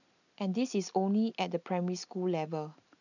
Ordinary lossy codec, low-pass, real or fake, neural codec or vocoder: none; 7.2 kHz; real; none